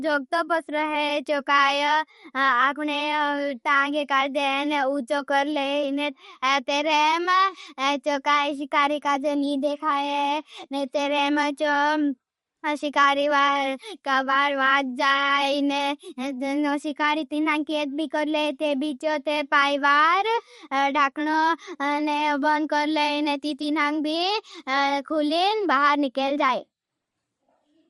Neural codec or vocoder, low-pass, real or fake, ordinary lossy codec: vocoder, 44.1 kHz, 128 mel bands every 512 samples, BigVGAN v2; 19.8 kHz; fake; MP3, 48 kbps